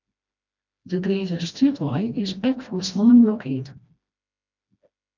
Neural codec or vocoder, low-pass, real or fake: codec, 16 kHz, 1 kbps, FreqCodec, smaller model; 7.2 kHz; fake